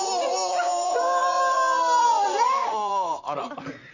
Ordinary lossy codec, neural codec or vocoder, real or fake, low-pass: none; vocoder, 44.1 kHz, 128 mel bands, Pupu-Vocoder; fake; 7.2 kHz